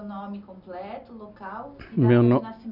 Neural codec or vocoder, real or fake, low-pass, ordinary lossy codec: none; real; 5.4 kHz; none